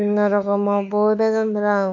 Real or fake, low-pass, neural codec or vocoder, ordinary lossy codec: fake; 7.2 kHz; autoencoder, 48 kHz, 32 numbers a frame, DAC-VAE, trained on Japanese speech; none